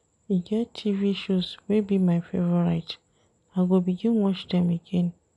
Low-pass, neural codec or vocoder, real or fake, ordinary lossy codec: 10.8 kHz; none; real; none